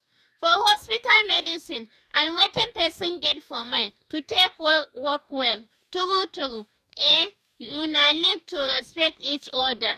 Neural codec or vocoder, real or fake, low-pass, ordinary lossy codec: codec, 44.1 kHz, 2.6 kbps, DAC; fake; 14.4 kHz; none